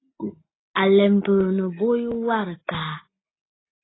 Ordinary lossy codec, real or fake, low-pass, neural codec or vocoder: AAC, 16 kbps; real; 7.2 kHz; none